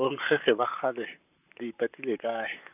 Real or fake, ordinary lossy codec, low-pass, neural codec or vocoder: fake; none; 3.6 kHz; vocoder, 44.1 kHz, 128 mel bands, Pupu-Vocoder